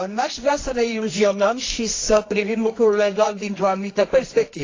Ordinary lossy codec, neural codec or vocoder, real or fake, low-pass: AAC, 32 kbps; codec, 24 kHz, 0.9 kbps, WavTokenizer, medium music audio release; fake; 7.2 kHz